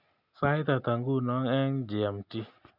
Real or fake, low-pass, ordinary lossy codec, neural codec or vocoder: real; 5.4 kHz; none; none